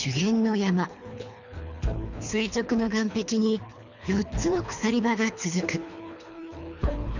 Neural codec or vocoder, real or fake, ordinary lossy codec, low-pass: codec, 24 kHz, 3 kbps, HILCodec; fake; none; 7.2 kHz